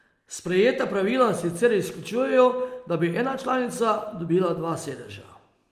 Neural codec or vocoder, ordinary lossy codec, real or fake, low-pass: none; Opus, 32 kbps; real; 14.4 kHz